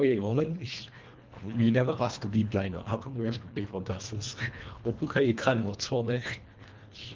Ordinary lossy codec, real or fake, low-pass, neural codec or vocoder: Opus, 32 kbps; fake; 7.2 kHz; codec, 24 kHz, 1.5 kbps, HILCodec